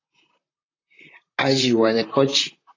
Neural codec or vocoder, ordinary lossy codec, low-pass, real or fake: vocoder, 44.1 kHz, 128 mel bands, Pupu-Vocoder; AAC, 32 kbps; 7.2 kHz; fake